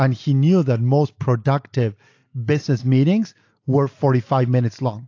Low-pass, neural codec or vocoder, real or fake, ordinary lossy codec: 7.2 kHz; none; real; AAC, 48 kbps